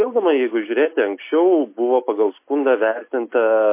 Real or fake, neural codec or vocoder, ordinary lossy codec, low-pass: real; none; MP3, 24 kbps; 3.6 kHz